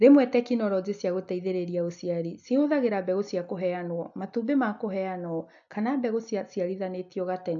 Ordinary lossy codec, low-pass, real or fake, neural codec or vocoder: AAC, 64 kbps; 7.2 kHz; real; none